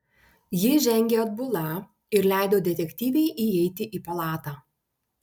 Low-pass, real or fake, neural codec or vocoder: 19.8 kHz; real; none